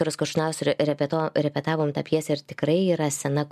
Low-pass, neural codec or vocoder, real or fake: 14.4 kHz; none; real